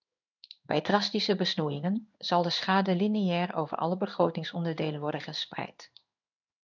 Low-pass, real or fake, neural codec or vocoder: 7.2 kHz; fake; codec, 16 kHz in and 24 kHz out, 1 kbps, XY-Tokenizer